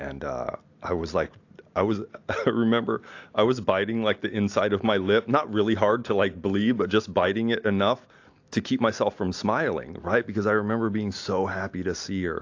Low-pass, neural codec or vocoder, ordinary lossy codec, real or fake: 7.2 kHz; none; AAC, 48 kbps; real